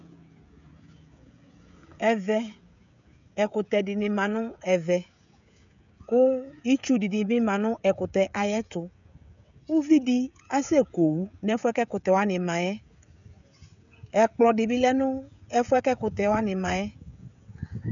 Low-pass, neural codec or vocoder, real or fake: 7.2 kHz; codec, 16 kHz, 16 kbps, FreqCodec, smaller model; fake